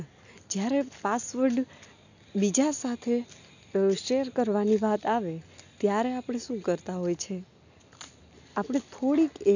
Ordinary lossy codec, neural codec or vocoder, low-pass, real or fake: none; none; 7.2 kHz; real